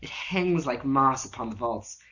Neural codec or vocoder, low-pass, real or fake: none; 7.2 kHz; real